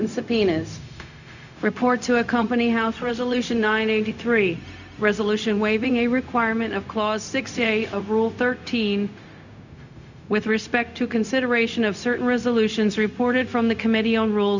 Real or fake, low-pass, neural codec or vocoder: fake; 7.2 kHz; codec, 16 kHz, 0.4 kbps, LongCat-Audio-Codec